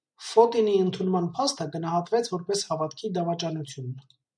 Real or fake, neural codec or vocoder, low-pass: real; none; 9.9 kHz